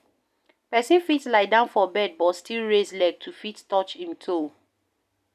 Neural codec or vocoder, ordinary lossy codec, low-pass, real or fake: none; none; 14.4 kHz; real